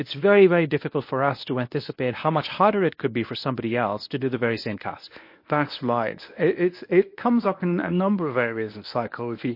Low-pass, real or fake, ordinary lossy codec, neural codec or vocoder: 5.4 kHz; fake; MP3, 32 kbps; codec, 24 kHz, 0.9 kbps, WavTokenizer, medium speech release version 1